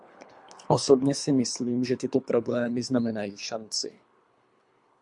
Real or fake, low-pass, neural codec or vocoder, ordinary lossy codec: fake; 10.8 kHz; codec, 24 kHz, 3 kbps, HILCodec; MP3, 64 kbps